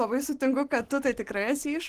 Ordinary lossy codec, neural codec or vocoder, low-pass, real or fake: Opus, 16 kbps; none; 14.4 kHz; real